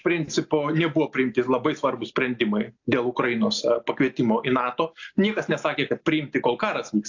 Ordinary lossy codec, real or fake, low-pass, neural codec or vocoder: AAC, 48 kbps; real; 7.2 kHz; none